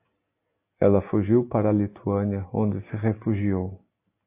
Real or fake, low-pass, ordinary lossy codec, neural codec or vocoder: real; 3.6 kHz; AAC, 24 kbps; none